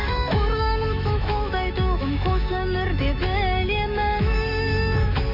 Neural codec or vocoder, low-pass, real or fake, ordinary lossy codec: autoencoder, 48 kHz, 128 numbers a frame, DAC-VAE, trained on Japanese speech; 5.4 kHz; fake; none